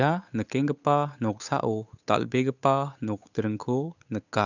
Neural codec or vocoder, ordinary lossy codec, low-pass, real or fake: codec, 16 kHz, 8 kbps, FunCodec, trained on Chinese and English, 25 frames a second; none; 7.2 kHz; fake